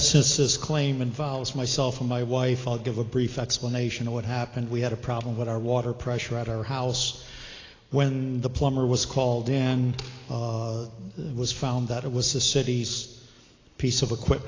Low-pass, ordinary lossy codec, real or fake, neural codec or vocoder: 7.2 kHz; AAC, 32 kbps; real; none